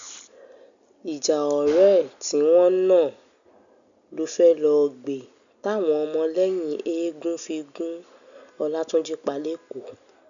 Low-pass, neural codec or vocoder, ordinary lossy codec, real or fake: 7.2 kHz; none; none; real